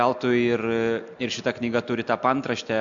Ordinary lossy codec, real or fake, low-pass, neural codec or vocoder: AAC, 64 kbps; real; 7.2 kHz; none